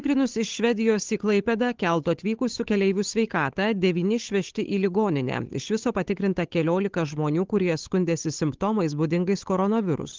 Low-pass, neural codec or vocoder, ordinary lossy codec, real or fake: 7.2 kHz; codec, 16 kHz, 8 kbps, FunCodec, trained on Chinese and English, 25 frames a second; Opus, 16 kbps; fake